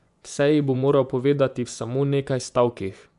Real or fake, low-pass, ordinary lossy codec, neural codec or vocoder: fake; 10.8 kHz; MP3, 96 kbps; vocoder, 24 kHz, 100 mel bands, Vocos